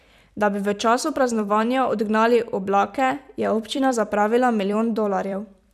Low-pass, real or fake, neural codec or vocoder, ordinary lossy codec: 14.4 kHz; fake; vocoder, 44.1 kHz, 128 mel bands every 256 samples, BigVGAN v2; none